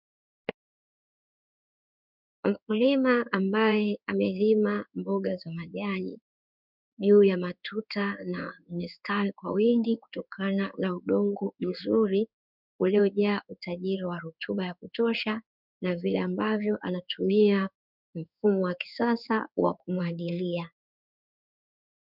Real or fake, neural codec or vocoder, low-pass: fake; codec, 16 kHz in and 24 kHz out, 1 kbps, XY-Tokenizer; 5.4 kHz